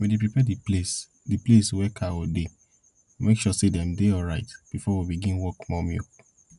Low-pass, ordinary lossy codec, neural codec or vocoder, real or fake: 10.8 kHz; none; none; real